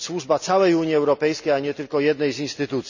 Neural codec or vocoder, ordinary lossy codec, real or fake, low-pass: none; none; real; 7.2 kHz